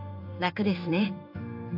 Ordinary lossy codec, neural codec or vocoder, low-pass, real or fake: AAC, 48 kbps; codec, 16 kHz in and 24 kHz out, 1 kbps, XY-Tokenizer; 5.4 kHz; fake